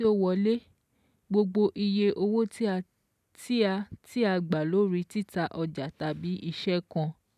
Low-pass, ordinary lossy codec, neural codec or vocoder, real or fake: 14.4 kHz; none; none; real